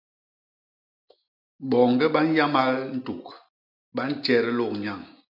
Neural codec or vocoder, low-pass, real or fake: none; 5.4 kHz; real